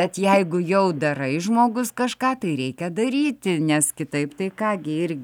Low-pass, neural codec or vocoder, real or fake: 19.8 kHz; none; real